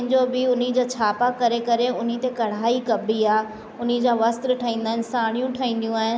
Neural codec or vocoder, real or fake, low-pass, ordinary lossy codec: none; real; none; none